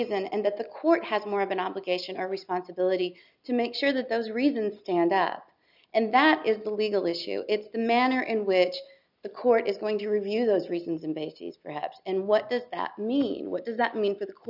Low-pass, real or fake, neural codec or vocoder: 5.4 kHz; real; none